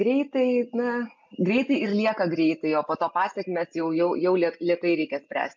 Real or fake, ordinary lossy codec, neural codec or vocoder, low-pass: real; MP3, 64 kbps; none; 7.2 kHz